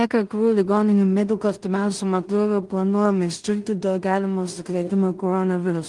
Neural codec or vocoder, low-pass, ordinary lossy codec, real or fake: codec, 16 kHz in and 24 kHz out, 0.4 kbps, LongCat-Audio-Codec, two codebook decoder; 10.8 kHz; Opus, 24 kbps; fake